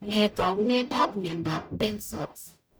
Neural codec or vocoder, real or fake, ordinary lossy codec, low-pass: codec, 44.1 kHz, 0.9 kbps, DAC; fake; none; none